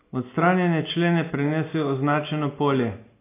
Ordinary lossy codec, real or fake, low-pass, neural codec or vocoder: AAC, 24 kbps; real; 3.6 kHz; none